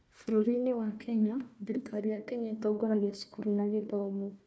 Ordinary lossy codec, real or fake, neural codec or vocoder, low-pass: none; fake; codec, 16 kHz, 1 kbps, FunCodec, trained on Chinese and English, 50 frames a second; none